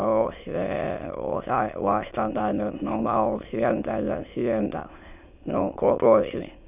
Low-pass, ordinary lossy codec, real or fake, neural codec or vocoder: 3.6 kHz; none; fake; autoencoder, 22.05 kHz, a latent of 192 numbers a frame, VITS, trained on many speakers